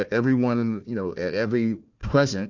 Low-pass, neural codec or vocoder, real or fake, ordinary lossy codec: 7.2 kHz; codec, 16 kHz, 1 kbps, FunCodec, trained on Chinese and English, 50 frames a second; fake; Opus, 64 kbps